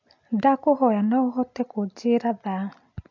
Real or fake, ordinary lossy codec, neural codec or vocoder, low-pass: real; MP3, 64 kbps; none; 7.2 kHz